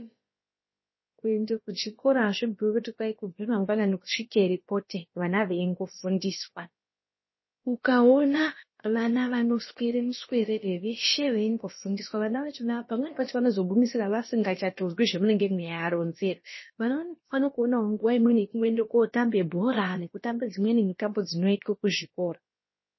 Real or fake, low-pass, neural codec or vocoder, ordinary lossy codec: fake; 7.2 kHz; codec, 16 kHz, about 1 kbps, DyCAST, with the encoder's durations; MP3, 24 kbps